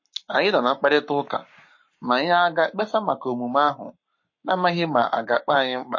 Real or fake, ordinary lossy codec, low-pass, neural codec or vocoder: fake; MP3, 32 kbps; 7.2 kHz; codec, 44.1 kHz, 7.8 kbps, Pupu-Codec